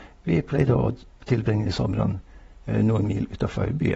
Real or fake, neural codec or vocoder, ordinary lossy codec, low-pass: real; none; AAC, 24 kbps; 19.8 kHz